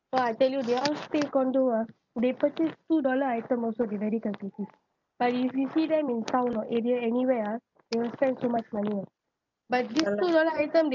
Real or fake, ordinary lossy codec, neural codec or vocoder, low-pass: real; none; none; 7.2 kHz